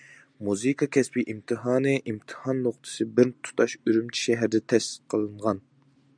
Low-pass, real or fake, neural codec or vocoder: 9.9 kHz; real; none